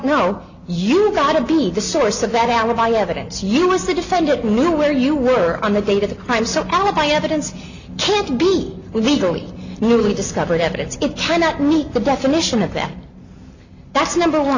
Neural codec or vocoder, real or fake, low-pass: none; real; 7.2 kHz